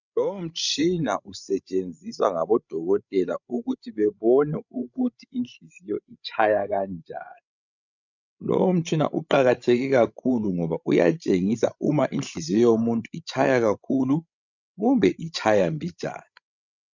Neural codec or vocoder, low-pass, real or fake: codec, 16 kHz, 16 kbps, FreqCodec, larger model; 7.2 kHz; fake